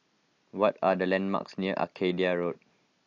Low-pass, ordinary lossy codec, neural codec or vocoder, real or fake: 7.2 kHz; AAC, 48 kbps; none; real